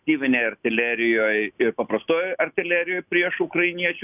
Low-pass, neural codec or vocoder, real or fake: 3.6 kHz; none; real